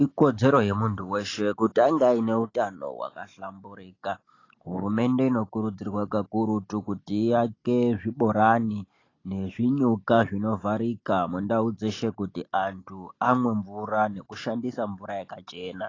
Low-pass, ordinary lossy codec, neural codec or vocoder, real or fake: 7.2 kHz; AAC, 32 kbps; none; real